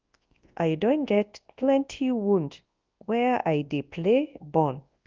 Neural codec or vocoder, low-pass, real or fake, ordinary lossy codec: codec, 24 kHz, 0.9 kbps, WavTokenizer, large speech release; 7.2 kHz; fake; Opus, 32 kbps